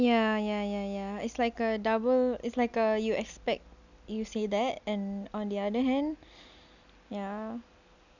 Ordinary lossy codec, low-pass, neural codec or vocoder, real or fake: none; 7.2 kHz; none; real